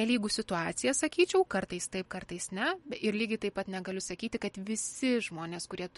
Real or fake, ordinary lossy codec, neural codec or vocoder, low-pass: real; MP3, 48 kbps; none; 19.8 kHz